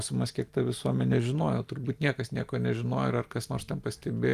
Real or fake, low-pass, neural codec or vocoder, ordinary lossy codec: real; 14.4 kHz; none; Opus, 32 kbps